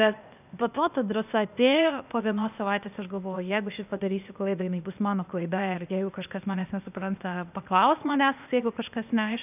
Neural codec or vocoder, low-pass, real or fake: codec, 16 kHz, 0.8 kbps, ZipCodec; 3.6 kHz; fake